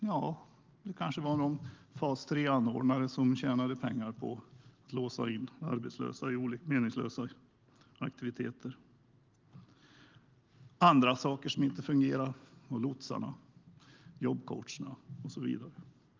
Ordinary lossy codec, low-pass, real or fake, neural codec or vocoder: Opus, 32 kbps; 7.2 kHz; real; none